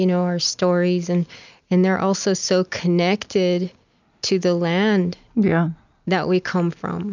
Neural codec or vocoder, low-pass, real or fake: none; 7.2 kHz; real